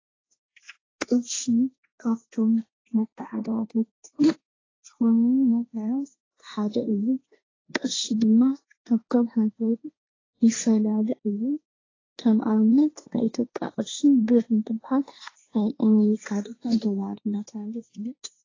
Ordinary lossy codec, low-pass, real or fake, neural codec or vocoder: AAC, 32 kbps; 7.2 kHz; fake; codec, 16 kHz, 1.1 kbps, Voila-Tokenizer